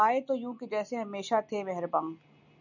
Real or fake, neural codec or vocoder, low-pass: real; none; 7.2 kHz